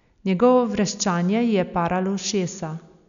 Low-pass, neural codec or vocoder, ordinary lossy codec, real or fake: 7.2 kHz; none; none; real